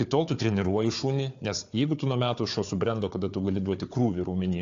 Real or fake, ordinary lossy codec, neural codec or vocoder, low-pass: fake; AAC, 48 kbps; codec, 16 kHz, 4 kbps, FunCodec, trained on Chinese and English, 50 frames a second; 7.2 kHz